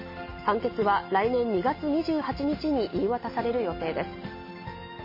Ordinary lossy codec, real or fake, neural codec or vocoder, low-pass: MP3, 24 kbps; real; none; 5.4 kHz